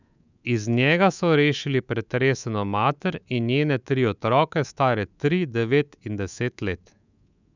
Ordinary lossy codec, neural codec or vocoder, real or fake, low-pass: none; codec, 24 kHz, 3.1 kbps, DualCodec; fake; 7.2 kHz